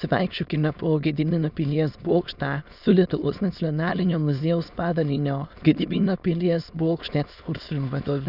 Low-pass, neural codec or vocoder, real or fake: 5.4 kHz; autoencoder, 22.05 kHz, a latent of 192 numbers a frame, VITS, trained on many speakers; fake